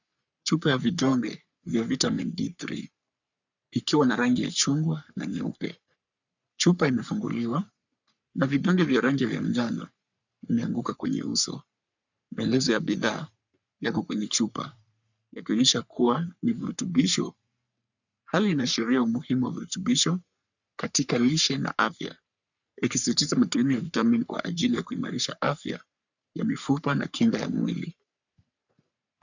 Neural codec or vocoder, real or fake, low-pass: codec, 44.1 kHz, 3.4 kbps, Pupu-Codec; fake; 7.2 kHz